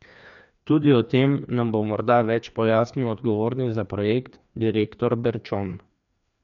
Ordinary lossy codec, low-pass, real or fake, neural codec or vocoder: none; 7.2 kHz; fake; codec, 16 kHz, 2 kbps, FreqCodec, larger model